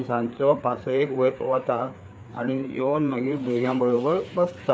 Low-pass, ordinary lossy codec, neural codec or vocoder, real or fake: none; none; codec, 16 kHz, 4 kbps, FreqCodec, larger model; fake